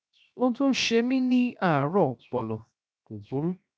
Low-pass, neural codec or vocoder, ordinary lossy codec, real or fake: none; codec, 16 kHz, 0.7 kbps, FocalCodec; none; fake